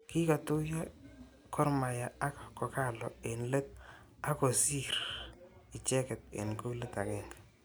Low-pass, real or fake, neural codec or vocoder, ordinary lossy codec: none; real; none; none